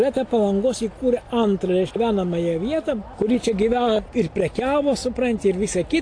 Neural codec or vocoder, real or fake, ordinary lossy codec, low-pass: none; real; AAC, 48 kbps; 9.9 kHz